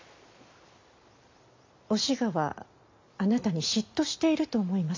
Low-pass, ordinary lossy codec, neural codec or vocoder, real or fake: 7.2 kHz; MP3, 64 kbps; none; real